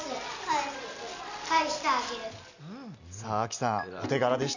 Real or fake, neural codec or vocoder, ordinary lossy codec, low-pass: real; none; none; 7.2 kHz